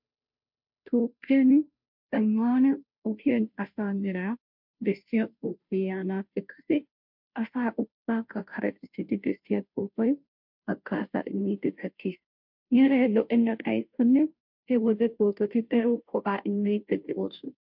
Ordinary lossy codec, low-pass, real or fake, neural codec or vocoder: MP3, 48 kbps; 5.4 kHz; fake; codec, 16 kHz, 0.5 kbps, FunCodec, trained on Chinese and English, 25 frames a second